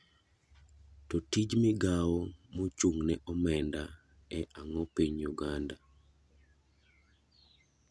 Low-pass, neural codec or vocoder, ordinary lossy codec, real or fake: none; none; none; real